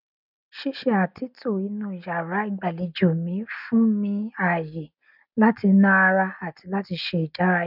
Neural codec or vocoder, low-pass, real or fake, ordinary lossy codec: none; 5.4 kHz; real; none